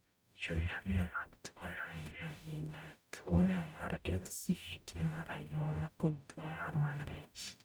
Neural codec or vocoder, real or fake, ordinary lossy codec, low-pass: codec, 44.1 kHz, 0.9 kbps, DAC; fake; none; none